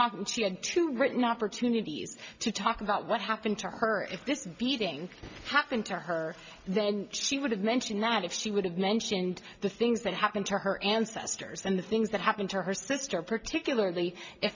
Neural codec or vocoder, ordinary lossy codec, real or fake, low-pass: none; MP3, 64 kbps; real; 7.2 kHz